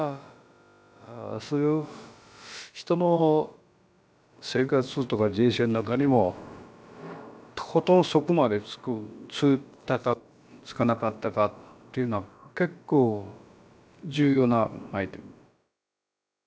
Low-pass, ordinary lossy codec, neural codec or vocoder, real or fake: none; none; codec, 16 kHz, about 1 kbps, DyCAST, with the encoder's durations; fake